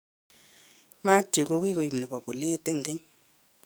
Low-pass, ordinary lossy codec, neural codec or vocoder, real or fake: none; none; codec, 44.1 kHz, 2.6 kbps, SNAC; fake